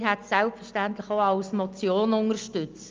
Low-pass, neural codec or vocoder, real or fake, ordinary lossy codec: 7.2 kHz; none; real; Opus, 24 kbps